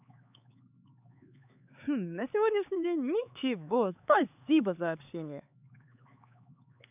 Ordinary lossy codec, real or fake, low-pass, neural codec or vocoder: none; fake; 3.6 kHz; codec, 16 kHz, 4 kbps, X-Codec, HuBERT features, trained on LibriSpeech